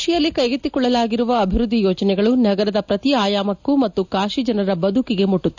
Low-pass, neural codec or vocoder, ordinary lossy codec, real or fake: 7.2 kHz; none; none; real